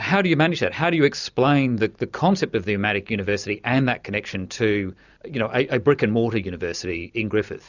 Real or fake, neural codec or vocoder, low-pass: real; none; 7.2 kHz